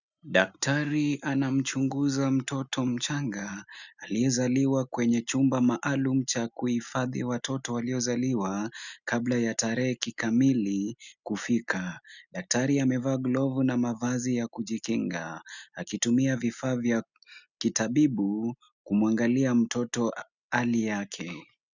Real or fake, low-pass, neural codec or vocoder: real; 7.2 kHz; none